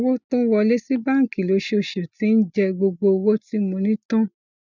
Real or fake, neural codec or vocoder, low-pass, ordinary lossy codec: real; none; 7.2 kHz; none